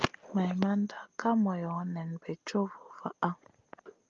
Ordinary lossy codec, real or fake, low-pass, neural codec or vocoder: Opus, 32 kbps; real; 7.2 kHz; none